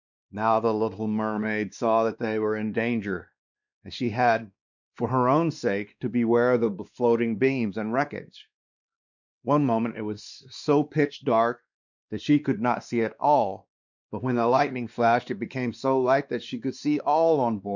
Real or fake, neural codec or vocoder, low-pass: fake; codec, 16 kHz, 2 kbps, X-Codec, WavLM features, trained on Multilingual LibriSpeech; 7.2 kHz